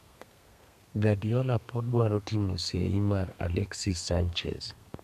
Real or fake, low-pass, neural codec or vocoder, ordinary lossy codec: fake; 14.4 kHz; codec, 32 kHz, 1.9 kbps, SNAC; none